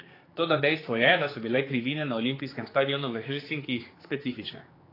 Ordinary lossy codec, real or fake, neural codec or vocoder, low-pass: AAC, 24 kbps; fake; codec, 16 kHz, 4 kbps, X-Codec, HuBERT features, trained on general audio; 5.4 kHz